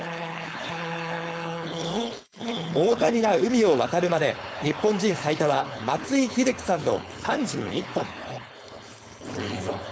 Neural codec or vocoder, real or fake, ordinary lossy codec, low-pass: codec, 16 kHz, 4.8 kbps, FACodec; fake; none; none